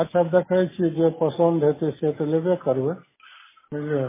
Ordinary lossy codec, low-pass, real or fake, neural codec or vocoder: MP3, 16 kbps; 3.6 kHz; real; none